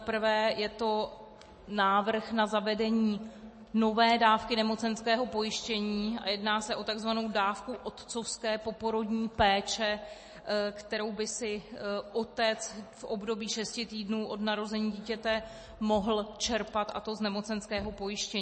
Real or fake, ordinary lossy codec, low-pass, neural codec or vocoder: real; MP3, 32 kbps; 10.8 kHz; none